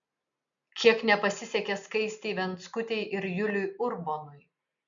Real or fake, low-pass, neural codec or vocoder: real; 7.2 kHz; none